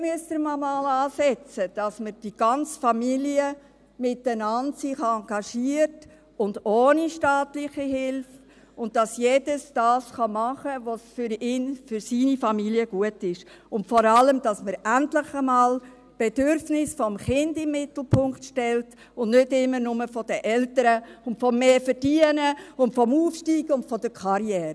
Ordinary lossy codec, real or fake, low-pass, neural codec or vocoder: none; real; none; none